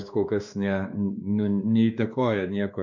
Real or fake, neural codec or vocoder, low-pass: fake; codec, 16 kHz, 2 kbps, X-Codec, WavLM features, trained on Multilingual LibriSpeech; 7.2 kHz